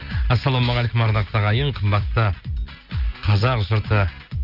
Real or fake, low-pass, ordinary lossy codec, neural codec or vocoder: real; 5.4 kHz; Opus, 32 kbps; none